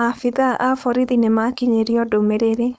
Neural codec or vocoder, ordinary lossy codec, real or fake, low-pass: codec, 16 kHz, 4.8 kbps, FACodec; none; fake; none